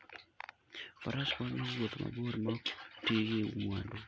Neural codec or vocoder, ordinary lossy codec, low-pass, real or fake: none; none; none; real